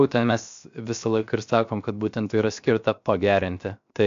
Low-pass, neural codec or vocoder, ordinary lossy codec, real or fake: 7.2 kHz; codec, 16 kHz, 0.7 kbps, FocalCodec; MP3, 64 kbps; fake